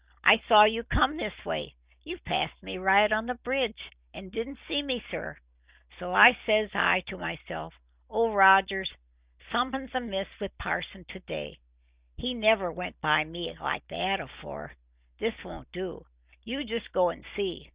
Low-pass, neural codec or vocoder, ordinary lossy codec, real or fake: 3.6 kHz; none; Opus, 64 kbps; real